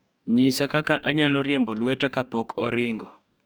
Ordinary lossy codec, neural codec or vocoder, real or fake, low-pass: none; codec, 44.1 kHz, 2.6 kbps, DAC; fake; none